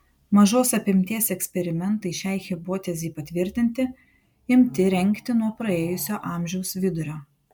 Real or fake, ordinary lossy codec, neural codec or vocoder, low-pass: real; MP3, 96 kbps; none; 19.8 kHz